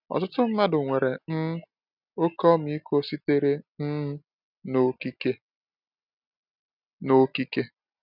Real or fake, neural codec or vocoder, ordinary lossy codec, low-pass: real; none; none; 5.4 kHz